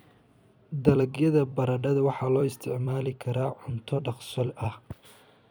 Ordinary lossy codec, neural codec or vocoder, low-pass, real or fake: none; vocoder, 44.1 kHz, 128 mel bands every 256 samples, BigVGAN v2; none; fake